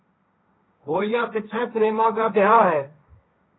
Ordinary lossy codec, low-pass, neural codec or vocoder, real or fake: AAC, 16 kbps; 7.2 kHz; codec, 16 kHz, 1.1 kbps, Voila-Tokenizer; fake